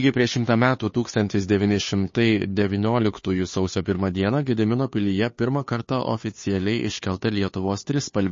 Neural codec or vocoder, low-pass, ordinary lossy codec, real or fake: codec, 16 kHz, 2 kbps, FunCodec, trained on Chinese and English, 25 frames a second; 7.2 kHz; MP3, 32 kbps; fake